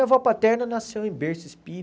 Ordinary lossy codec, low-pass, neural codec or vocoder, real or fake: none; none; none; real